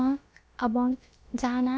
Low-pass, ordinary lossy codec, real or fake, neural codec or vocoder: none; none; fake; codec, 16 kHz, about 1 kbps, DyCAST, with the encoder's durations